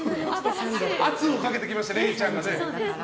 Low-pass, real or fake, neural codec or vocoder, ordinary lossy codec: none; real; none; none